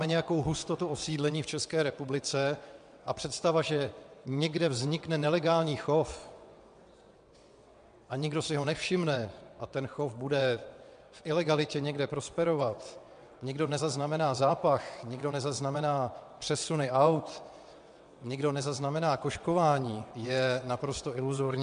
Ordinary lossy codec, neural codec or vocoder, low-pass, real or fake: MP3, 64 kbps; vocoder, 22.05 kHz, 80 mel bands, WaveNeXt; 9.9 kHz; fake